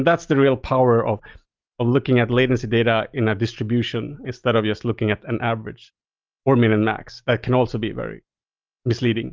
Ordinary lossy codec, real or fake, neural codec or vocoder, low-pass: Opus, 32 kbps; real; none; 7.2 kHz